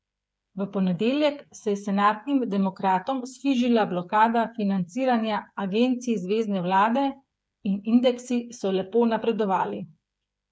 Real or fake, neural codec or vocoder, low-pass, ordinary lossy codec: fake; codec, 16 kHz, 8 kbps, FreqCodec, smaller model; none; none